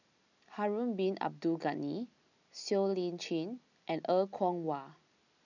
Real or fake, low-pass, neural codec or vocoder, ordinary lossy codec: real; 7.2 kHz; none; none